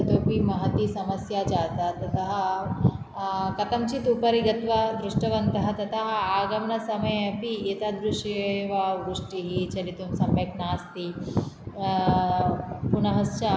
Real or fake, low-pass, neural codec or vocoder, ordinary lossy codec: real; none; none; none